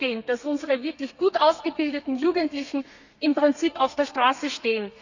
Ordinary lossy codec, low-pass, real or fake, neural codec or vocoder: none; 7.2 kHz; fake; codec, 32 kHz, 1.9 kbps, SNAC